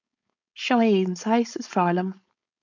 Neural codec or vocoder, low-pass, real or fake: codec, 16 kHz, 4.8 kbps, FACodec; 7.2 kHz; fake